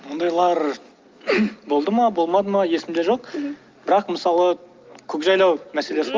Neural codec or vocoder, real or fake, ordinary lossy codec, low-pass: none; real; Opus, 32 kbps; 7.2 kHz